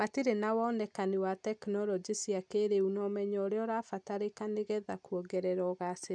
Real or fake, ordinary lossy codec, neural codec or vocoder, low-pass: real; none; none; 9.9 kHz